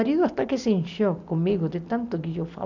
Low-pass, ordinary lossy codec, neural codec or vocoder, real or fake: 7.2 kHz; none; none; real